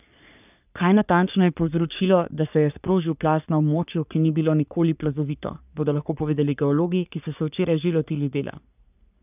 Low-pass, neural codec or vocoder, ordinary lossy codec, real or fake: 3.6 kHz; codec, 44.1 kHz, 3.4 kbps, Pupu-Codec; none; fake